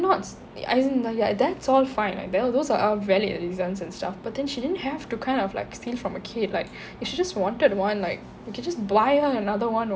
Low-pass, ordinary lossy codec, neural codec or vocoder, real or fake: none; none; none; real